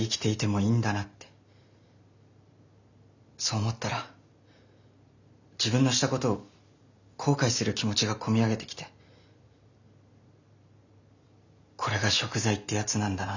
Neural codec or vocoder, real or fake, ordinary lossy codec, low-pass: none; real; none; 7.2 kHz